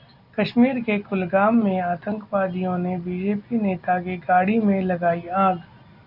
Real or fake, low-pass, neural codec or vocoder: real; 5.4 kHz; none